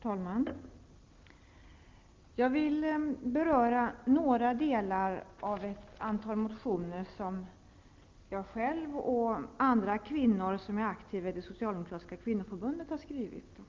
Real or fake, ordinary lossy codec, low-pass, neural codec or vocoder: real; Opus, 32 kbps; 7.2 kHz; none